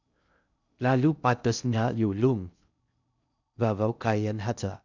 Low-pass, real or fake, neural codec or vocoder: 7.2 kHz; fake; codec, 16 kHz in and 24 kHz out, 0.6 kbps, FocalCodec, streaming, 2048 codes